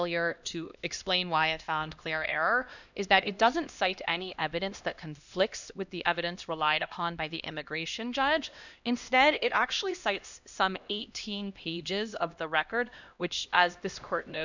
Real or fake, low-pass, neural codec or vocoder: fake; 7.2 kHz; codec, 16 kHz, 1 kbps, X-Codec, HuBERT features, trained on LibriSpeech